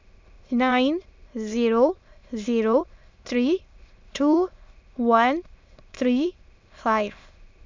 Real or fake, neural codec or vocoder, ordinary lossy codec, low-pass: fake; autoencoder, 22.05 kHz, a latent of 192 numbers a frame, VITS, trained on many speakers; MP3, 64 kbps; 7.2 kHz